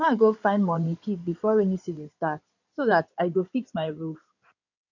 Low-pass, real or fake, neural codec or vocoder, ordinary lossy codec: 7.2 kHz; fake; codec, 16 kHz in and 24 kHz out, 2.2 kbps, FireRedTTS-2 codec; none